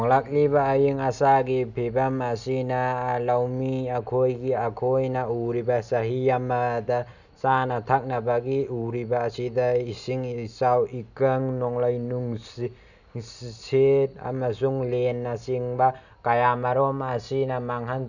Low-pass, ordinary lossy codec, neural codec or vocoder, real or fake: 7.2 kHz; none; none; real